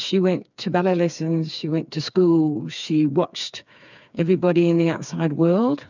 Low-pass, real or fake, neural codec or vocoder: 7.2 kHz; fake; codec, 16 kHz, 4 kbps, FreqCodec, smaller model